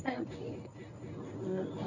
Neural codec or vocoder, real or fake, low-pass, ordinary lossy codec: codec, 24 kHz, 0.9 kbps, WavTokenizer, medium speech release version 1; fake; 7.2 kHz; none